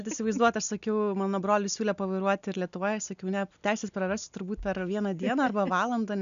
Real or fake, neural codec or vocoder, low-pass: real; none; 7.2 kHz